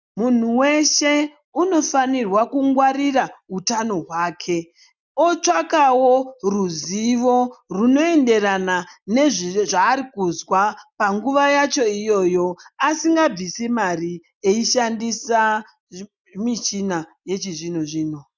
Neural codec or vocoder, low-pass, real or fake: none; 7.2 kHz; real